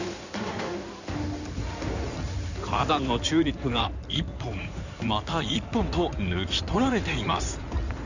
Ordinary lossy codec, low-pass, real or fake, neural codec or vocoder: none; 7.2 kHz; fake; codec, 16 kHz in and 24 kHz out, 2.2 kbps, FireRedTTS-2 codec